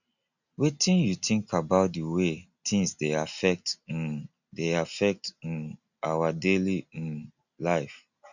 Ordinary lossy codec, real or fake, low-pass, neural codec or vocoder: none; real; 7.2 kHz; none